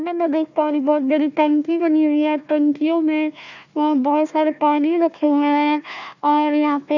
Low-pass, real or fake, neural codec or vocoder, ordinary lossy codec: 7.2 kHz; fake; codec, 16 kHz, 1 kbps, FunCodec, trained on Chinese and English, 50 frames a second; none